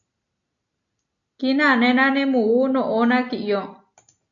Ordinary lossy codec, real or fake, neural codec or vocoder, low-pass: AAC, 64 kbps; real; none; 7.2 kHz